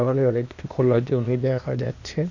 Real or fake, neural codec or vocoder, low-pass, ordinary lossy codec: fake; codec, 16 kHz, 0.8 kbps, ZipCodec; 7.2 kHz; none